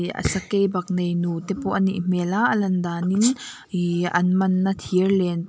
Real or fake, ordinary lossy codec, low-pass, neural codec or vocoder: real; none; none; none